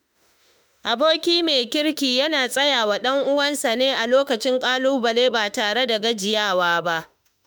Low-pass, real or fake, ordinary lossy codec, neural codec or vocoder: none; fake; none; autoencoder, 48 kHz, 32 numbers a frame, DAC-VAE, trained on Japanese speech